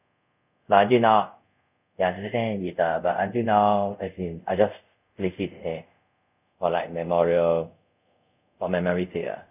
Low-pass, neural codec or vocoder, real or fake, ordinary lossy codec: 3.6 kHz; codec, 24 kHz, 0.5 kbps, DualCodec; fake; none